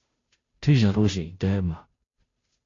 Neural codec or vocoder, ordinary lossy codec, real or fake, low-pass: codec, 16 kHz, 0.5 kbps, FunCodec, trained on Chinese and English, 25 frames a second; AAC, 32 kbps; fake; 7.2 kHz